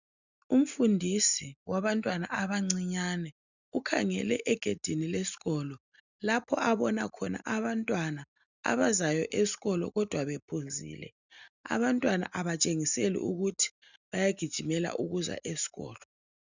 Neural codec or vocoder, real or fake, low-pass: none; real; 7.2 kHz